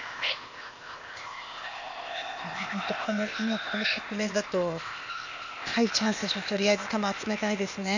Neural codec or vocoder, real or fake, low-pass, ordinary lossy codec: codec, 16 kHz, 0.8 kbps, ZipCodec; fake; 7.2 kHz; none